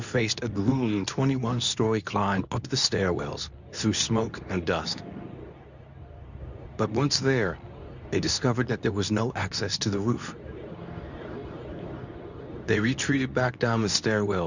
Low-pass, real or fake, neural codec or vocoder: 7.2 kHz; fake; codec, 24 kHz, 0.9 kbps, WavTokenizer, medium speech release version 2